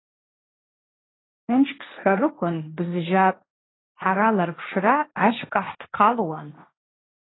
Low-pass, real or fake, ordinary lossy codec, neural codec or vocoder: 7.2 kHz; fake; AAC, 16 kbps; codec, 16 kHz, 1.1 kbps, Voila-Tokenizer